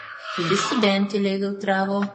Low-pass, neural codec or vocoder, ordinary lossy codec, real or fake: 10.8 kHz; codec, 44.1 kHz, 2.6 kbps, SNAC; MP3, 32 kbps; fake